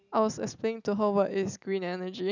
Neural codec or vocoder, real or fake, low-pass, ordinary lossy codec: none; real; 7.2 kHz; none